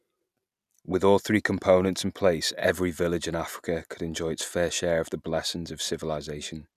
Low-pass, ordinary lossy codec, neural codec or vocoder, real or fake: 14.4 kHz; none; none; real